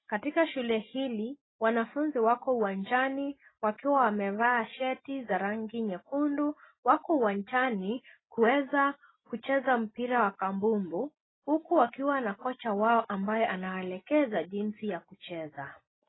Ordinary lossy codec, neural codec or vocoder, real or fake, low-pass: AAC, 16 kbps; none; real; 7.2 kHz